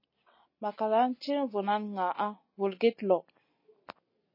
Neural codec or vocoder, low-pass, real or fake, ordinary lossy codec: none; 5.4 kHz; real; MP3, 24 kbps